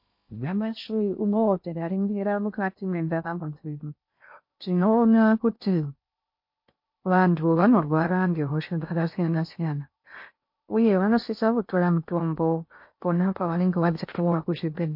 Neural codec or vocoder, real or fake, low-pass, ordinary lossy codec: codec, 16 kHz in and 24 kHz out, 0.6 kbps, FocalCodec, streaming, 2048 codes; fake; 5.4 kHz; MP3, 32 kbps